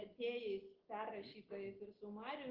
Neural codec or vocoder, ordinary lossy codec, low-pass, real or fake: none; Opus, 16 kbps; 5.4 kHz; real